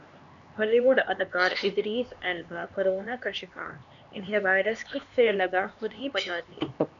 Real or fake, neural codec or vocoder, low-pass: fake; codec, 16 kHz, 2 kbps, X-Codec, HuBERT features, trained on LibriSpeech; 7.2 kHz